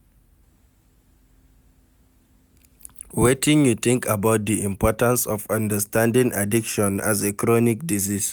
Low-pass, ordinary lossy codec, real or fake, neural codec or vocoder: none; none; real; none